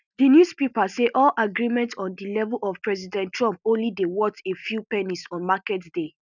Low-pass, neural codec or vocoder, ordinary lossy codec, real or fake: 7.2 kHz; none; none; real